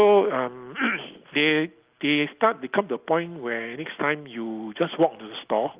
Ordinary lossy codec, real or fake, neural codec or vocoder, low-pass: Opus, 32 kbps; real; none; 3.6 kHz